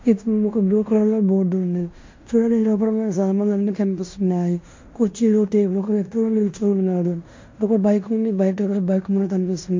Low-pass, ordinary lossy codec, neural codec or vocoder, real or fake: 7.2 kHz; MP3, 48 kbps; codec, 16 kHz in and 24 kHz out, 0.9 kbps, LongCat-Audio-Codec, fine tuned four codebook decoder; fake